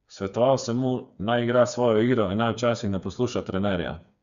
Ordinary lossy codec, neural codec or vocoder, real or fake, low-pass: none; codec, 16 kHz, 4 kbps, FreqCodec, smaller model; fake; 7.2 kHz